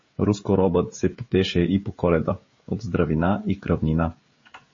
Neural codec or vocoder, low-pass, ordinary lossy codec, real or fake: codec, 16 kHz, 16 kbps, FreqCodec, smaller model; 7.2 kHz; MP3, 32 kbps; fake